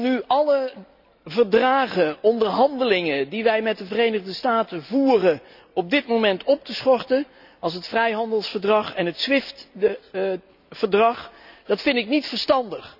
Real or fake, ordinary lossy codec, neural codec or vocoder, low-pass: real; none; none; 5.4 kHz